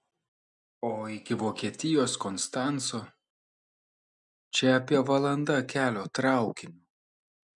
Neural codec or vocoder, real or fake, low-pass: none; real; 10.8 kHz